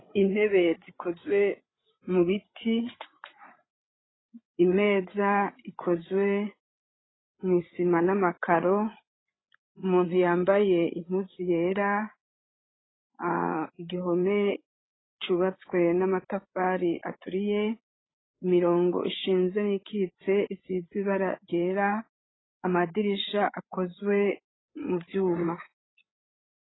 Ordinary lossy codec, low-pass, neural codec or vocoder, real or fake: AAC, 16 kbps; 7.2 kHz; codec, 16 kHz, 8 kbps, FreqCodec, larger model; fake